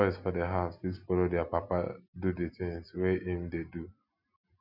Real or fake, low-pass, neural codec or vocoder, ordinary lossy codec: real; 5.4 kHz; none; none